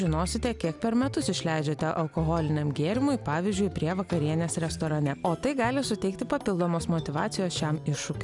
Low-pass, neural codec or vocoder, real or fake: 10.8 kHz; none; real